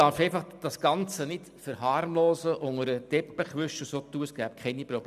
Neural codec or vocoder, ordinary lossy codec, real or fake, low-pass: none; none; real; 14.4 kHz